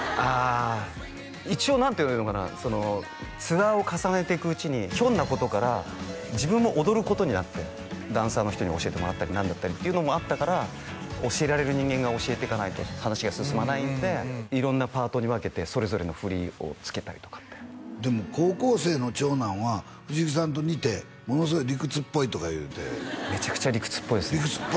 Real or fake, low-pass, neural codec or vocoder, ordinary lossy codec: real; none; none; none